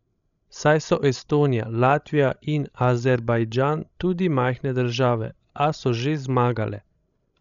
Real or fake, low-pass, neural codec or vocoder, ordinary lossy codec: fake; 7.2 kHz; codec, 16 kHz, 16 kbps, FreqCodec, larger model; none